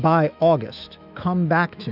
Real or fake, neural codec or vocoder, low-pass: fake; autoencoder, 48 kHz, 128 numbers a frame, DAC-VAE, trained on Japanese speech; 5.4 kHz